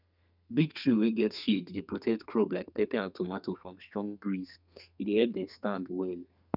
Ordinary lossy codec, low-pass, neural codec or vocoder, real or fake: none; 5.4 kHz; codec, 32 kHz, 1.9 kbps, SNAC; fake